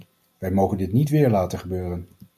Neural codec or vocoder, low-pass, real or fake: none; 14.4 kHz; real